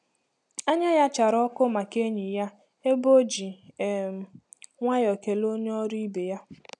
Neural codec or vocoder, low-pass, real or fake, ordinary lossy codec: none; 10.8 kHz; real; none